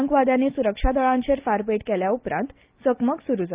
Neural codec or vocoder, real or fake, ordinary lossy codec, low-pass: none; real; Opus, 32 kbps; 3.6 kHz